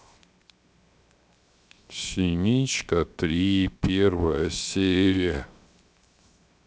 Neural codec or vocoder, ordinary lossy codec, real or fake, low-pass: codec, 16 kHz, 0.7 kbps, FocalCodec; none; fake; none